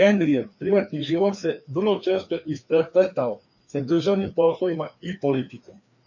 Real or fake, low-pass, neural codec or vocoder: fake; 7.2 kHz; codec, 16 kHz, 2 kbps, FreqCodec, larger model